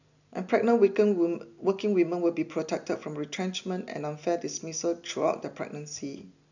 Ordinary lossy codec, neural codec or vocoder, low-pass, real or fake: none; none; 7.2 kHz; real